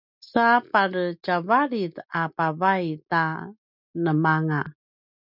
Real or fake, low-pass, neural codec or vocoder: real; 5.4 kHz; none